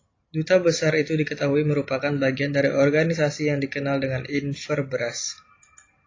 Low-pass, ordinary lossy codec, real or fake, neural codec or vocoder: 7.2 kHz; AAC, 32 kbps; real; none